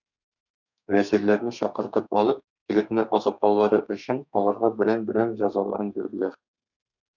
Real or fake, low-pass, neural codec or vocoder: fake; 7.2 kHz; codec, 32 kHz, 1.9 kbps, SNAC